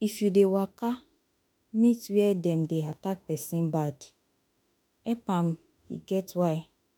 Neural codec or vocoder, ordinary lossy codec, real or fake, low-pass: autoencoder, 48 kHz, 32 numbers a frame, DAC-VAE, trained on Japanese speech; none; fake; none